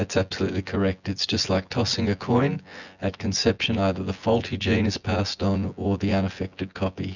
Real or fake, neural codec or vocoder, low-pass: fake; vocoder, 24 kHz, 100 mel bands, Vocos; 7.2 kHz